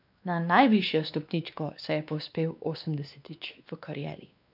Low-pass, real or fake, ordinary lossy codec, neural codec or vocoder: 5.4 kHz; fake; none; codec, 16 kHz, 2 kbps, X-Codec, WavLM features, trained on Multilingual LibriSpeech